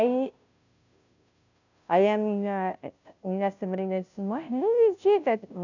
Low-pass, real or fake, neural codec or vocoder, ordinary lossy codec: 7.2 kHz; fake; codec, 16 kHz, 0.5 kbps, FunCodec, trained on Chinese and English, 25 frames a second; none